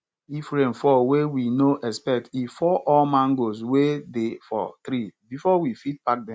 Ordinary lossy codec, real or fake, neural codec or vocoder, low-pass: none; real; none; none